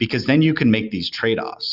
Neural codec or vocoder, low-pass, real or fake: none; 5.4 kHz; real